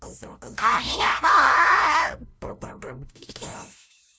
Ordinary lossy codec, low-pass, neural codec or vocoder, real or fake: none; none; codec, 16 kHz, 0.5 kbps, FunCodec, trained on LibriTTS, 25 frames a second; fake